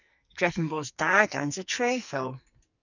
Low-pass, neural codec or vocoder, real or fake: 7.2 kHz; codec, 44.1 kHz, 2.6 kbps, SNAC; fake